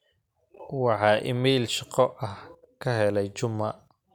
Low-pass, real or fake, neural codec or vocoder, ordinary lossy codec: 19.8 kHz; real; none; none